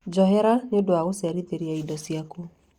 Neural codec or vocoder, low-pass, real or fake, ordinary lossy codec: none; 19.8 kHz; real; Opus, 64 kbps